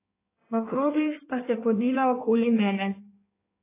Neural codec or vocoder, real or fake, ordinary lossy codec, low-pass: codec, 16 kHz in and 24 kHz out, 1.1 kbps, FireRedTTS-2 codec; fake; AAC, 24 kbps; 3.6 kHz